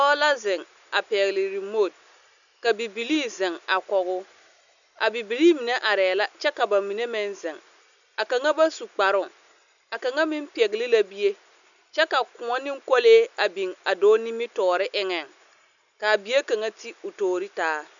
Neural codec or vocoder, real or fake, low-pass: none; real; 7.2 kHz